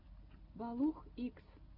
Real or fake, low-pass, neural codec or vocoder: real; 5.4 kHz; none